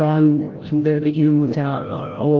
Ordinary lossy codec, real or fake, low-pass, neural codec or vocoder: Opus, 16 kbps; fake; 7.2 kHz; codec, 16 kHz, 0.5 kbps, FreqCodec, larger model